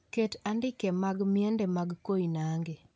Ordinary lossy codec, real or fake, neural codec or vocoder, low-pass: none; real; none; none